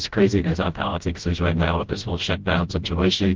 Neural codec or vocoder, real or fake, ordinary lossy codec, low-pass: codec, 16 kHz, 0.5 kbps, FreqCodec, smaller model; fake; Opus, 16 kbps; 7.2 kHz